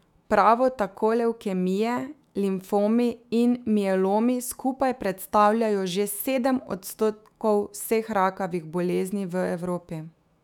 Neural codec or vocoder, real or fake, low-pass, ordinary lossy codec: autoencoder, 48 kHz, 128 numbers a frame, DAC-VAE, trained on Japanese speech; fake; 19.8 kHz; none